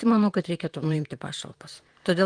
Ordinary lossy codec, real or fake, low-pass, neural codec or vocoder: Opus, 24 kbps; fake; 9.9 kHz; vocoder, 44.1 kHz, 128 mel bands, Pupu-Vocoder